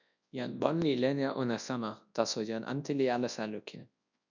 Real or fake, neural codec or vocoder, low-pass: fake; codec, 24 kHz, 0.9 kbps, WavTokenizer, large speech release; 7.2 kHz